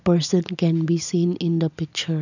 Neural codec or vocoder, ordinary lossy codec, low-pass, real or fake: none; none; 7.2 kHz; real